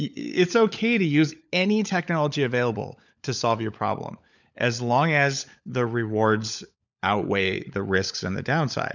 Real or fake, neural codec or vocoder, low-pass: fake; codec, 16 kHz, 16 kbps, FunCodec, trained on LibriTTS, 50 frames a second; 7.2 kHz